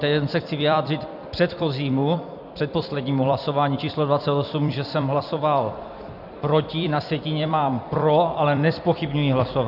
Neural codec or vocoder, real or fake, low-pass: vocoder, 44.1 kHz, 128 mel bands every 256 samples, BigVGAN v2; fake; 5.4 kHz